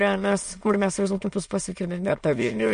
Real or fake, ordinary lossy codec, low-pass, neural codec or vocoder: fake; MP3, 48 kbps; 9.9 kHz; autoencoder, 22.05 kHz, a latent of 192 numbers a frame, VITS, trained on many speakers